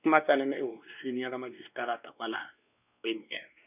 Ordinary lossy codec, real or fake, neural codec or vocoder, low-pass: none; fake; codec, 16 kHz, 2 kbps, X-Codec, WavLM features, trained on Multilingual LibriSpeech; 3.6 kHz